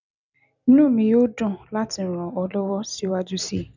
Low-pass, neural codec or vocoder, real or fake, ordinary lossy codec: 7.2 kHz; none; real; none